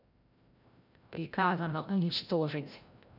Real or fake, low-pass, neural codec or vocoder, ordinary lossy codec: fake; 5.4 kHz; codec, 16 kHz, 0.5 kbps, FreqCodec, larger model; none